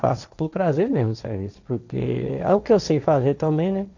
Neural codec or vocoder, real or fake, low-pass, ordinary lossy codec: codec, 16 kHz, 1.1 kbps, Voila-Tokenizer; fake; 7.2 kHz; none